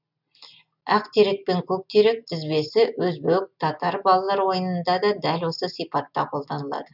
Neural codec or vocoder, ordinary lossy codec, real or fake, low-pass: none; none; real; 5.4 kHz